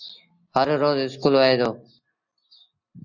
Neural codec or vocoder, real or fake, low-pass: none; real; 7.2 kHz